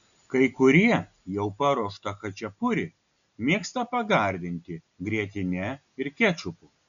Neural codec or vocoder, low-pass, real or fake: none; 7.2 kHz; real